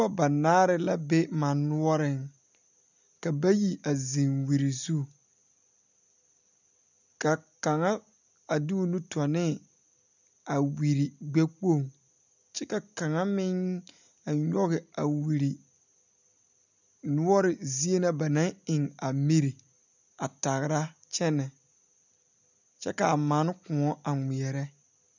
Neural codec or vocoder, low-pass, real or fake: none; 7.2 kHz; real